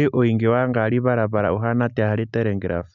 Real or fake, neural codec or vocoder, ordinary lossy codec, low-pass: real; none; none; 7.2 kHz